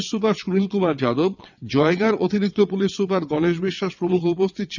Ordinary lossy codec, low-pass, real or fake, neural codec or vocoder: none; 7.2 kHz; fake; vocoder, 22.05 kHz, 80 mel bands, WaveNeXt